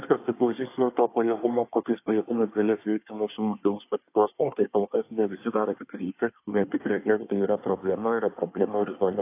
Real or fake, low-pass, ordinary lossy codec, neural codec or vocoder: fake; 3.6 kHz; AAC, 24 kbps; codec, 24 kHz, 1 kbps, SNAC